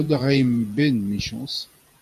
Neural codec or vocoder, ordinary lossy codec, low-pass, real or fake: vocoder, 48 kHz, 128 mel bands, Vocos; AAC, 96 kbps; 14.4 kHz; fake